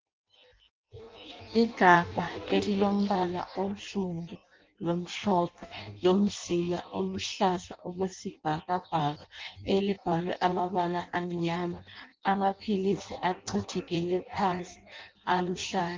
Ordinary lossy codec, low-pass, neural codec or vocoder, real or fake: Opus, 24 kbps; 7.2 kHz; codec, 16 kHz in and 24 kHz out, 0.6 kbps, FireRedTTS-2 codec; fake